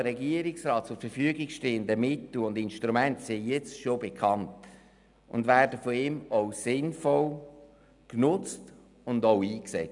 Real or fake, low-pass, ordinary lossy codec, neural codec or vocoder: real; 10.8 kHz; none; none